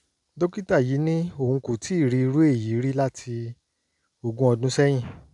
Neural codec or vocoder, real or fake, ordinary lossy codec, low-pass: none; real; none; 10.8 kHz